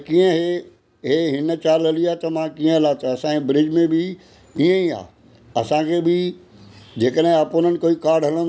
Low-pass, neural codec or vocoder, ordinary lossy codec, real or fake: none; none; none; real